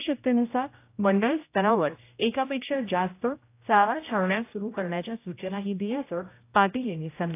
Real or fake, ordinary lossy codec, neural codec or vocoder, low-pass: fake; AAC, 24 kbps; codec, 16 kHz, 0.5 kbps, X-Codec, HuBERT features, trained on general audio; 3.6 kHz